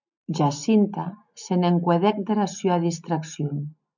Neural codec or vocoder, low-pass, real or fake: none; 7.2 kHz; real